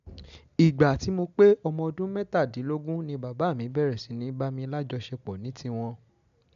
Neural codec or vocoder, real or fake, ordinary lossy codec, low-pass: none; real; MP3, 96 kbps; 7.2 kHz